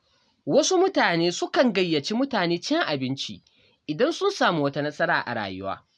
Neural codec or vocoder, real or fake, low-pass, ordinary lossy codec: none; real; none; none